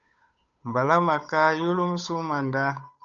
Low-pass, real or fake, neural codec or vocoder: 7.2 kHz; fake; codec, 16 kHz, 8 kbps, FunCodec, trained on Chinese and English, 25 frames a second